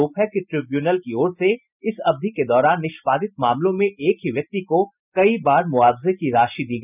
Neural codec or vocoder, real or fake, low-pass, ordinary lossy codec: none; real; 3.6 kHz; none